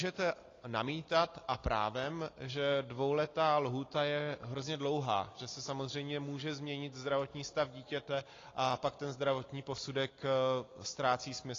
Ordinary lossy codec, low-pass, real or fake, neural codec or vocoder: AAC, 32 kbps; 7.2 kHz; real; none